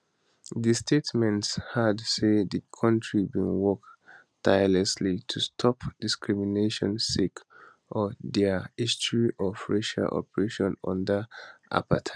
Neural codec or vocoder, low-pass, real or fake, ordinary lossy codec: none; none; real; none